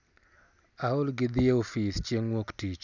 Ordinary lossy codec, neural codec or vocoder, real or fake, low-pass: none; none; real; 7.2 kHz